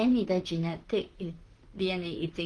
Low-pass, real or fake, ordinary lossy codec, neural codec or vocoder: 9.9 kHz; fake; Opus, 16 kbps; vocoder, 44.1 kHz, 128 mel bands, Pupu-Vocoder